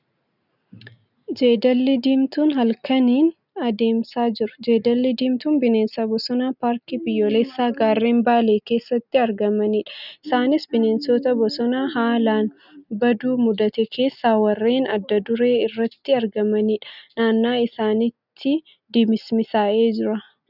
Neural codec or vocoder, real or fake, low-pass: none; real; 5.4 kHz